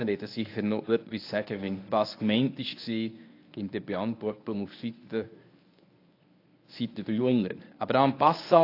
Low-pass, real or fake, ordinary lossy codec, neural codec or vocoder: 5.4 kHz; fake; AAC, 32 kbps; codec, 24 kHz, 0.9 kbps, WavTokenizer, medium speech release version 1